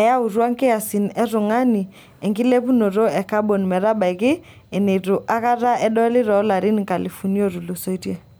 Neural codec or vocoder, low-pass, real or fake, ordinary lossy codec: none; none; real; none